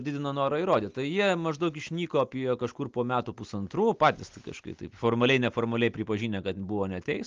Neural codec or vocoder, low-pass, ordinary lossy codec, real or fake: none; 7.2 kHz; Opus, 32 kbps; real